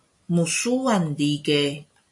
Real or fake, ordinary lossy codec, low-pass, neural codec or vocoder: real; MP3, 48 kbps; 10.8 kHz; none